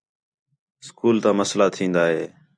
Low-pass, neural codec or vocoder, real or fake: 9.9 kHz; none; real